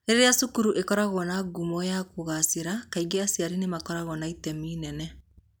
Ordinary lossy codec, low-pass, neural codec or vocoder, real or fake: none; none; none; real